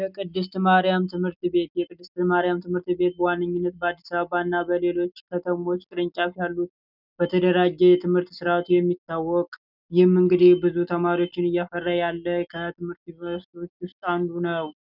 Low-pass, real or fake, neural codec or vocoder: 5.4 kHz; real; none